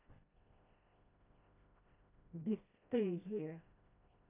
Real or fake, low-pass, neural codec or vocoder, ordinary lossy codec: fake; 3.6 kHz; codec, 16 kHz, 1 kbps, FreqCodec, smaller model; none